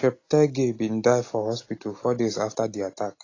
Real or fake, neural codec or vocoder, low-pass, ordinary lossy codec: real; none; 7.2 kHz; AAC, 32 kbps